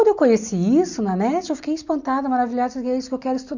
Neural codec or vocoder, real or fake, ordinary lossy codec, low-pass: none; real; none; 7.2 kHz